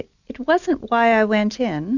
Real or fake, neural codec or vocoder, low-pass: real; none; 7.2 kHz